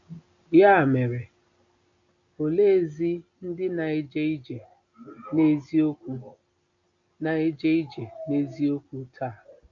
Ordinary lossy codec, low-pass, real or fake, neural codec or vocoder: AAC, 64 kbps; 7.2 kHz; real; none